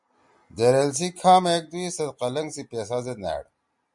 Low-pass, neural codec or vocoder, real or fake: 10.8 kHz; none; real